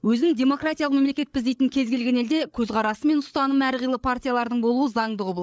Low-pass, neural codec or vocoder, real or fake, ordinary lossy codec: none; codec, 16 kHz, 8 kbps, FreqCodec, larger model; fake; none